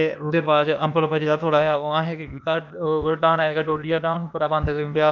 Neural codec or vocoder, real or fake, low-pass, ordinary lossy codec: codec, 16 kHz, 0.8 kbps, ZipCodec; fake; 7.2 kHz; none